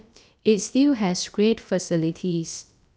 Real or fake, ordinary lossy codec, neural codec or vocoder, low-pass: fake; none; codec, 16 kHz, about 1 kbps, DyCAST, with the encoder's durations; none